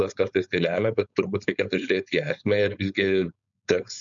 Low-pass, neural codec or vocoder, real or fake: 7.2 kHz; codec, 16 kHz, 4.8 kbps, FACodec; fake